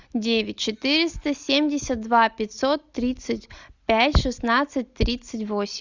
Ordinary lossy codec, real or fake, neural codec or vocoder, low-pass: Opus, 64 kbps; real; none; 7.2 kHz